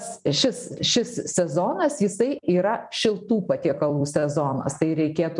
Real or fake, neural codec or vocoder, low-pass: fake; vocoder, 44.1 kHz, 128 mel bands every 256 samples, BigVGAN v2; 10.8 kHz